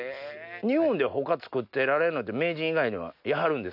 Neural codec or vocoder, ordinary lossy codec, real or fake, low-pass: none; none; real; 5.4 kHz